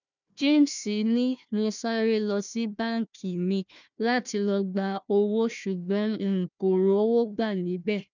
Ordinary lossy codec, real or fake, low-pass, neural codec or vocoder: none; fake; 7.2 kHz; codec, 16 kHz, 1 kbps, FunCodec, trained on Chinese and English, 50 frames a second